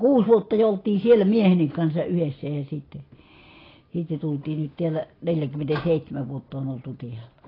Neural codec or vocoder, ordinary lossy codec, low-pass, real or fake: none; AAC, 24 kbps; 5.4 kHz; real